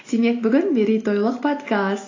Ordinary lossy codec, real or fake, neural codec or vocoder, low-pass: AAC, 32 kbps; real; none; 7.2 kHz